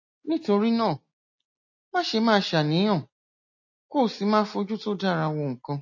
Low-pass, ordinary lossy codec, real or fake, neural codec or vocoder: 7.2 kHz; MP3, 32 kbps; real; none